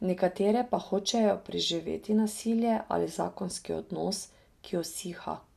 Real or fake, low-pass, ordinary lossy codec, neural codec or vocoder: real; 14.4 kHz; none; none